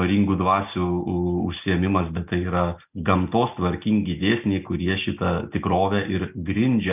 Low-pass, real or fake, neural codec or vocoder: 3.6 kHz; real; none